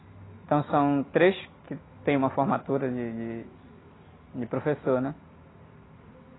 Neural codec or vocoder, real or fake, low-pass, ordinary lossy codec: none; real; 7.2 kHz; AAC, 16 kbps